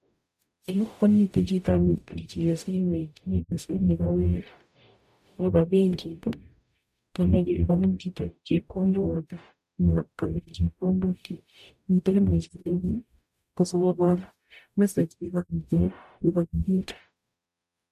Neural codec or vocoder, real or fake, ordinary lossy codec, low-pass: codec, 44.1 kHz, 0.9 kbps, DAC; fake; MP3, 96 kbps; 14.4 kHz